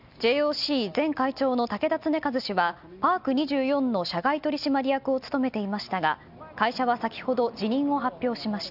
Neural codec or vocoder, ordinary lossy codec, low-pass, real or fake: none; none; 5.4 kHz; real